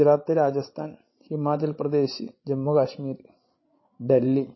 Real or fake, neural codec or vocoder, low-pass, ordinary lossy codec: fake; codec, 16 kHz, 16 kbps, FreqCodec, larger model; 7.2 kHz; MP3, 24 kbps